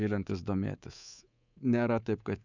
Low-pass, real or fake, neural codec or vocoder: 7.2 kHz; fake; codec, 24 kHz, 3.1 kbps, DualCodec